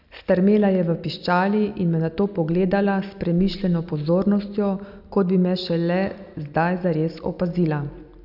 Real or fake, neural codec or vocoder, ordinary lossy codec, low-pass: real; none; none; 5.4 kHz